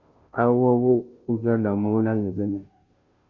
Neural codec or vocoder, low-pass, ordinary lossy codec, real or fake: codec, 16 kHz, 0.5 kbps, FunCodec, trained on Chinese and English, 25 frames a second; 7.2 kHz; Opus, 64 kbps; fake